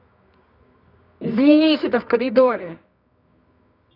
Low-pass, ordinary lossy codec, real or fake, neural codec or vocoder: 5.4 kHz; none; fake; codec, 24 kHz, 0.9 kbps, WavTokenizer, medium music audio release